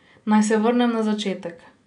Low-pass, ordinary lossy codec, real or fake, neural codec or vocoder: 9.9 kHz; none; real; none